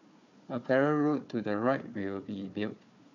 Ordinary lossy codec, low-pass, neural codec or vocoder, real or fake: none; 7.2 kHz; codec, 16 kHz, 4 kbps, FunCodec, trained on Chinese and English, 50 frames a second; fake